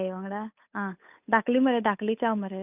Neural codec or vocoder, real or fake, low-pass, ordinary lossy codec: codec, 16 kHz, 8 kbps, FunCodec, trained on Chinese and English, 25 frames a second; fake; 3.6 kHz; none